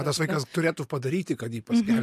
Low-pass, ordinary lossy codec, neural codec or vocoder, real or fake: 14.4 kHz; MP3, 64 kbps; none; real